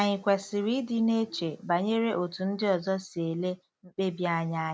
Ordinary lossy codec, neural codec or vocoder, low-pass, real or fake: none; none; none; real